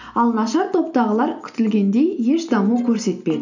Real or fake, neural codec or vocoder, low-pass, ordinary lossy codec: real; none; 7.2 kHz; none